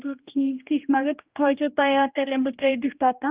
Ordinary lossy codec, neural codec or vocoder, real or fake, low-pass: Opus, 32 kbps; codec, 16 kHz, 1 kbps, X-Codec, HuBERT features, trained on general audio; fake; 3.6 kHz